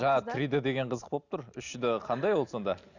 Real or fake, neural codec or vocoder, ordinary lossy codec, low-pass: real; none; none; 7.2 kHz